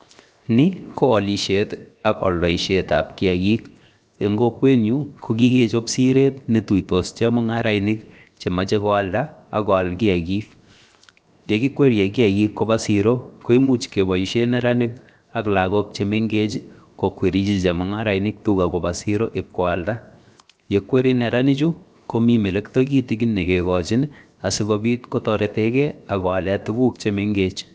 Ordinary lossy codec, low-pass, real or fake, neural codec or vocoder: none; none; fake; codec, 16 kHz, 0.7 kbps, FocalCodec